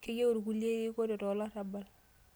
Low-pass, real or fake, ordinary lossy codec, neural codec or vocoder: none; real; none; none